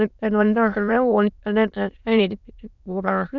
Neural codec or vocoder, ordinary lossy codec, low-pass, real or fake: autoencoder, 22.05 kHz, a latent of 192 numbers a frame, VITS, trained on many speakers; none; 7.2 kHz; fake